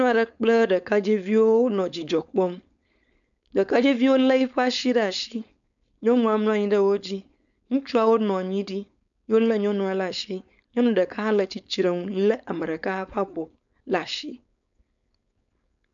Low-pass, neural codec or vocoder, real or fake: 7.2 kHz; codec, 16 kHz, 4.8 kbps, FACodec; fake